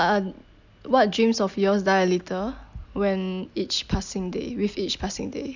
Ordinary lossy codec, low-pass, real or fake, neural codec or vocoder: none; 7.2 kHz; real; none